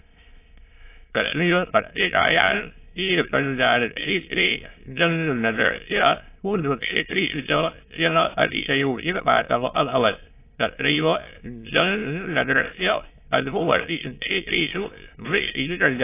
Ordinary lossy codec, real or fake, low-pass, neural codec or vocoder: AAC, 24 kbps; fake; 3.6 kHz; autoencoder, 22.05 kHz, a latent of 192 numbers a frame, VITS, trained on many speakers